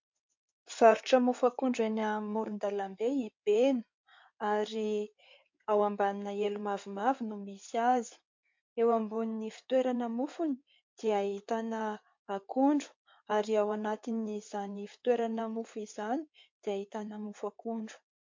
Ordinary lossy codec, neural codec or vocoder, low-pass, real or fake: MP3, 48 kbps; codec, 16 kHz in and 24 kHz out, 2.2 kbps, FireRedTTS-2 codec; 7.2 kHz; fake